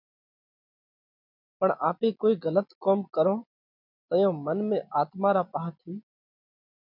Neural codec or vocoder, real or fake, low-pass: none; real; 5.4 kHz